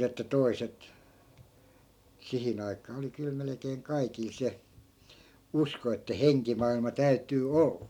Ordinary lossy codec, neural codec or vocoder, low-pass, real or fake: none; none; 19.8 kHz; real